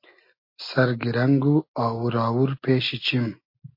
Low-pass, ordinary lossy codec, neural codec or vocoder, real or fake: 5.4 kHz; MP3, 32 kbps; none; real